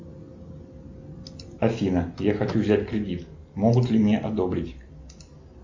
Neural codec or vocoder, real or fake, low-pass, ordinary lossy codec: none; real; 7.2 kHz; MP3, 48 kbps